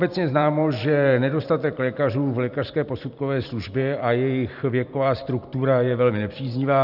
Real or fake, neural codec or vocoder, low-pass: fake; vocoder, 44.1 kHz, 128 mel bands every 512 samples, BigVGAN v2; 5.4 kHz